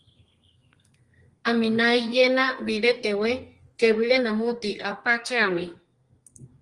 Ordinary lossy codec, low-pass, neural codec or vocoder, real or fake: Opus, 24 kbps; 10.8 kHz; codec, 32 kHz, 1.9 kbps, SNAC; fake